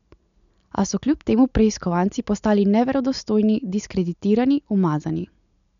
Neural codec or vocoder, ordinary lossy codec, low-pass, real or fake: none; none; 7.2 kHz; real